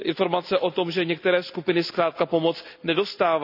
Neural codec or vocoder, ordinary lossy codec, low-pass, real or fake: none; none; 5.4 kHz; real